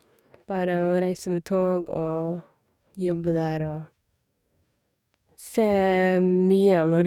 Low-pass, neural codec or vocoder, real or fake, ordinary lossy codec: 19.8 kHz; codec, 44.1 kHz, 2.6 kbps, DAC; fake; none